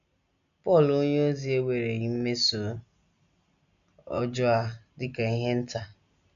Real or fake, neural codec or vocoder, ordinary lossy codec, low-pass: real; none; none; 7.2 kHz